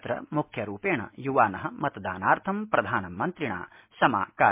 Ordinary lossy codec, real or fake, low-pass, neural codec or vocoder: MP3, 32 kbps; real; 3.6 kHz; none